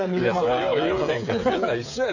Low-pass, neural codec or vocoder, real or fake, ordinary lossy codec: 7.2 kHz; codec, 16 kHz, 8 kbps, FreqCodec, smaller model; fake; none